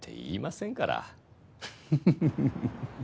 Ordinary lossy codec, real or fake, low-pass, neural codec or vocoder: none; real; none; none